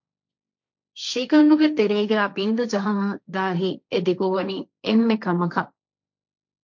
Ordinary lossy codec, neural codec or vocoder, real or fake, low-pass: MP3, 48 kbps; codec, 16 kHz, 1.1 kbps, Voila-Tokenizer; fake; 7.2 kHz